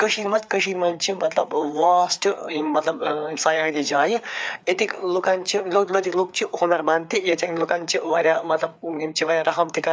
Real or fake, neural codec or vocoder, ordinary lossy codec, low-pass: fake; codec, 16 kHz, 4 kbps, FreqCodec, larger model; none; none